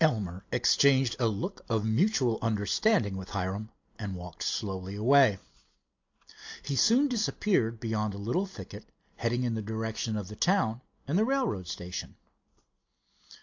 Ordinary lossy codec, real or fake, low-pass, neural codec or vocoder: AAC, 48 kbps; real; 7.2 kHz; none